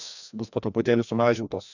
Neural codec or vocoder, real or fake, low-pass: codec, 16 kHz, 1 kbps, FreqCodec, larger model; fake; 7.2 kHz